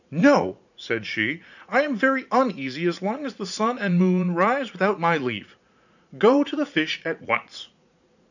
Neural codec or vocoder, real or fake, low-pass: vocoder, 44.1 kHz, 80 mel bands, Vocos; fake; 7.2 kHz